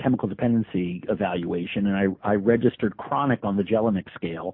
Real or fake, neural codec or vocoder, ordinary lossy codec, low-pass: real; none; MP3, 32 kbps; 5.4 kHz